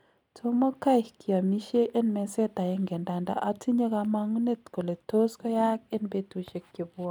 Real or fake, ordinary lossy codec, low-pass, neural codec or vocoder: real; none; 19.8 kHz; none